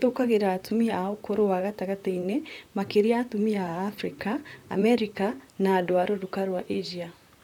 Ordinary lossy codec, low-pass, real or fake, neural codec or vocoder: none; 19.8 kHz; fake; vocoder, 44.1 kHz, 128 mel bands, Pupu-Vocoder